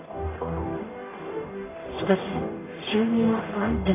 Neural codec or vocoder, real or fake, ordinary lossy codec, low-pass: codec, 44.1 kHz, 0.9 kbps, DAC; fake; AAC, 16 kbps; 3.6 kHz